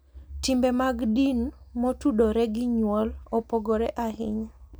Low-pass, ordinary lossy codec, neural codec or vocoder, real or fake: none; none; none; real